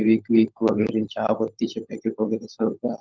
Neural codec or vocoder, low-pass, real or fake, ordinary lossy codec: codec, 16 kHz, 16 kbps, FunCodec, trained on LibriTTS, 50 frames a second; 7.2 kHz; fake; Opus, 32 kbps